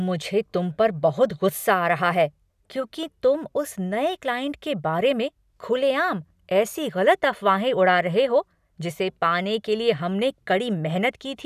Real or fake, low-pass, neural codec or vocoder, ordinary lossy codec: real; 14.4 kHz; none; none